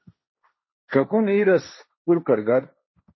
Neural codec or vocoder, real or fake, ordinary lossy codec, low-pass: codec, 16 kHz, 1.1 kbps, Voila-Tokenizer; fake; MP3, 24 kbps; 7.2 kHz